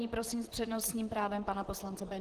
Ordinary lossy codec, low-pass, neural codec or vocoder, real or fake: Opus, 16 kbps; 14.4 kHz; vocoder, 44.1 kHz, 128 mel bands, Pupu-Vocoder; fake